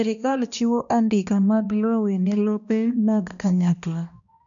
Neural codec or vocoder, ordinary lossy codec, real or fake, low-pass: codec, 16 kHz, 1 kbps, X-Codec, HuBERT features, trained on balanced general audio; none; fake; 7.2 kHz